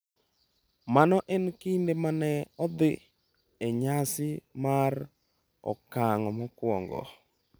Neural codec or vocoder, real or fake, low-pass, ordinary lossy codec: none; real; none; none